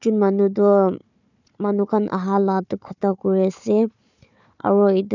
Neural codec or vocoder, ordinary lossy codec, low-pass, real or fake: codec, 16 kHz, 4 kbps, FunCodec, trained on Chinese and English, 50 frames a second; none; 7.2 kHz; fake